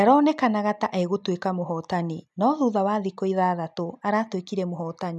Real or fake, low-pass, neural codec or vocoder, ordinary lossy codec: real; none; none; none